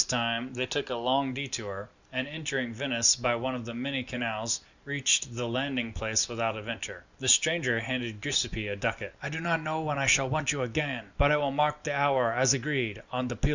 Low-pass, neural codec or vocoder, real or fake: 7.2 kHz; none; real